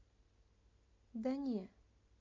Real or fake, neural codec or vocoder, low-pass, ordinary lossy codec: real; none; 7.2 kHz; MP3, 48 kbps